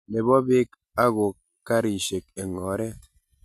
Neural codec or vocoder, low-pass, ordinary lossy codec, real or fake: none; 14.4 kHz; none; real